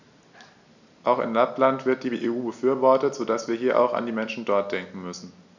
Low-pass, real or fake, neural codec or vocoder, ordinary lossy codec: 7.2 kHz; real; none; none